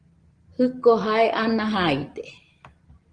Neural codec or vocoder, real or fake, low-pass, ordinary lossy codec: none; real; 9.9 kHz; Opus, 16 kbps